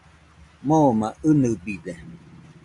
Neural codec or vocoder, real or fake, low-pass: none; real; 10.8 kHz